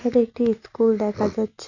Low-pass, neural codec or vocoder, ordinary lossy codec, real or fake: 7.2 kHz; none; none; real